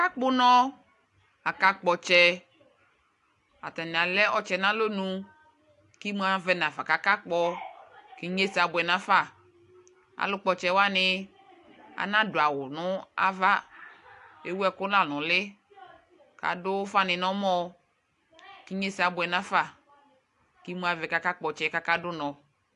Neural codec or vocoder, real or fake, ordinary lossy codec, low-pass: none; real; AAC, 64 kbps; 10.8 kHz